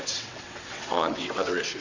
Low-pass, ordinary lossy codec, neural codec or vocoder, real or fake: 7.2 kHz; AAC, 48 kbps; vocoder, 22.05 kHz, 80 mel bands, WaveNeXt; fake